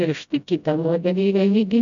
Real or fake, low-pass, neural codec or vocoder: fake; 7.2 kHz; codec, 16 kHz, 0.5 kbps, FreqCodec, smaller model